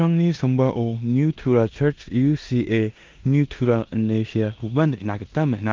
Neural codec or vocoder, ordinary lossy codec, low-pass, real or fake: codec, 16 kHz in and 24 kHz out, 0.9 kbps, LongCat-Audio-Codec, four codebook decoder; Opus, 32 kbps; 7.2 kHz; fake